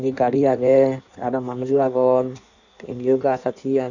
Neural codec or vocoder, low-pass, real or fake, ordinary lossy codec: codec, 16 kHz in and 24 kHz out, 1.1 kbps, FireRedTTS-2 codec; 7.2 kHz; fake; none